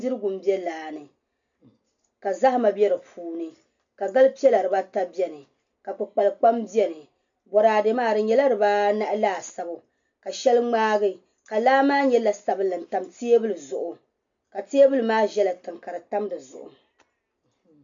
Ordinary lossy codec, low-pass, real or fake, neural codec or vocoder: MP3, 64 kbps; 7.2 kHz; real; none